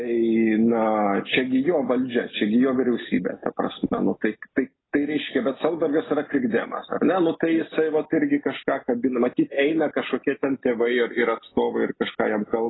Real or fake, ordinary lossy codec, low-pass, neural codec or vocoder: real; AAC, 16 kbps; 7.2 kHz; none